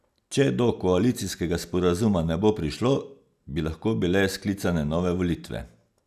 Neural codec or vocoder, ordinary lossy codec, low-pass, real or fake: none; none; 14.4 kHz; real